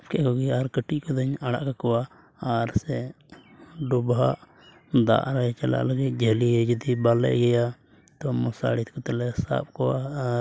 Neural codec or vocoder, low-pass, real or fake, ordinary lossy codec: none; none; real; none